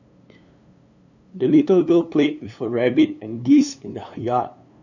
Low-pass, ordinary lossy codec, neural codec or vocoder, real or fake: 7.2 kHz; none; codec, 16 kHz, 2 kbps, FunCodec, trained on LibriTTS, 25 frames a second; fake